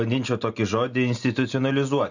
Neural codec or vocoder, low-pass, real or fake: none; 7.2 kHz; real